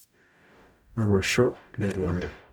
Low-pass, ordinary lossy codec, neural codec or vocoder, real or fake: none; none; codec, 44.1 kHz, 0.9 kbps, DAC; fake